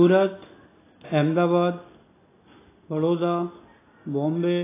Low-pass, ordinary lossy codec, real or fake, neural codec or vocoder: 3.6 kHz; AAC, 16 kbps; real; none